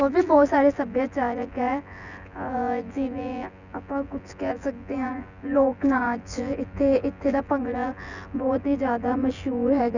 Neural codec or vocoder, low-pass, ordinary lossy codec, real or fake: vocoder, 24 kHz, 100 mel bands, Vocos; 7.2 kHz; none; fake